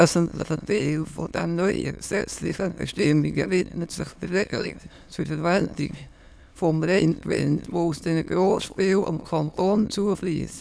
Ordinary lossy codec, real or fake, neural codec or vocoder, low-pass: none; fake; autoencoder, 22.05 kHz, a latent of 192 numbers a frame, VITS, trained on many speakers; none